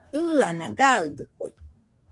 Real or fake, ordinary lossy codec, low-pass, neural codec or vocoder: fake; MP3, 96 kbps; 10.8 kHz; codec, 24 kHz, 1 kbps, SNAC